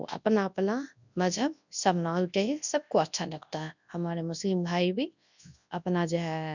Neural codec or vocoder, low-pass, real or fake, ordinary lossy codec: codec, 24 kHz, 0.9 kbps, WavTokenizer, large speech release; 7.2 kHz; fake; none